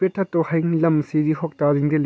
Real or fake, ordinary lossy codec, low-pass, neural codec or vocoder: real; none; none; none